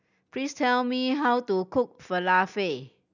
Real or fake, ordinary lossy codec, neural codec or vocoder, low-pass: real; none; none; 7.2 kHz